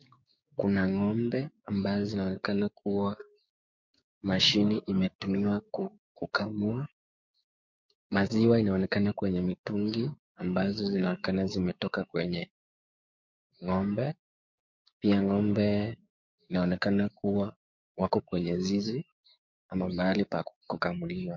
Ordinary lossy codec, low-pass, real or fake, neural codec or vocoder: MP3, 48 kbps; 7.2 kHz; fake; codec, 16 kHz, 6 kbps, DAC